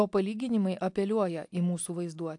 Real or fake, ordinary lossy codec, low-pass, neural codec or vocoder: real; AAC, 64 kbps; 10.8 kHz; none